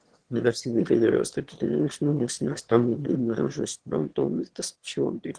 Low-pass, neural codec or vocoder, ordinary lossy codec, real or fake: 9.9 kHz; autoencoder, 22.05 kHz, a latent of 192 numbers a frame, VITS, trained on one speaker; Opus, 16 kbps; fake